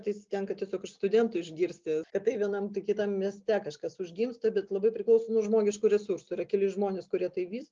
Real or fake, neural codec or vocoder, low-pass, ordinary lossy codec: real; none; 7.2 kHz; Opus, 24 kbps